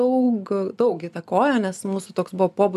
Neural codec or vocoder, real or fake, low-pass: none; real; 14.4 kHz